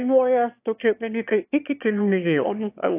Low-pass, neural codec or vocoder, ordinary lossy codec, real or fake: 3.6 kHz; autoencoder, 22.05 kHz, a latent of 192 numbers a frame, VITS, trained on one speaker; AAC, 32 kbps; fake